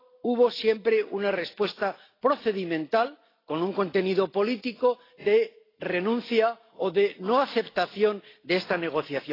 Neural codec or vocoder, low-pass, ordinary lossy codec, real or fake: none; 5.4 kHz; AAC, 24 kbps; real